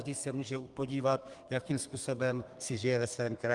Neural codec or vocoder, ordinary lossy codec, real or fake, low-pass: codec, 32 kHz, 1.9 kbps, SNAC; Opus, 32 kbps; fake; 10.8 kHz